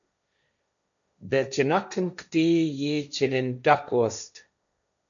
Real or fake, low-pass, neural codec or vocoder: fake; 7.2 kHz; codec, 16 kHz, 1.1 kbps, Voila-Tokenizer